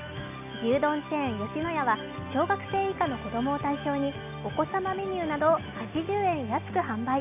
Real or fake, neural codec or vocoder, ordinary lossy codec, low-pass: real; none; none; 3.6 kHz